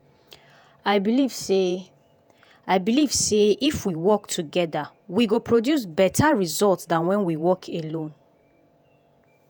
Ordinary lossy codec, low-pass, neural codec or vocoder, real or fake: none; none; vocoder, 48 kHz, 128 mel bands, Vocos; fake